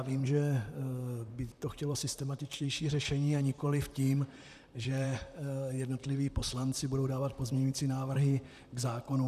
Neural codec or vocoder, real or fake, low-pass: vocoder, 44.1 kHz, 128 mel bands, Pupu-Vocoder; fake; 14.4 kHz